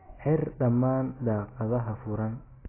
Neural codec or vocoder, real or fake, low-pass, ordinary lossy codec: none; real; 3.6 kHz; AAC, 16 kbps